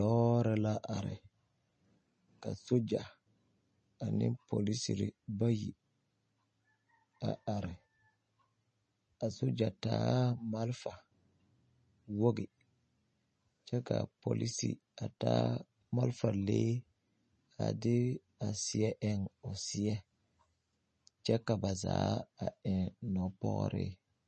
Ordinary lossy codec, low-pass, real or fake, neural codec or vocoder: MP3, 32 kbps; 10.8 kHz; real; none